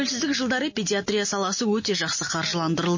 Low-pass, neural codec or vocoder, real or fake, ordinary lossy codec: 7.2 kHz; none; real; MP3, 32 kbps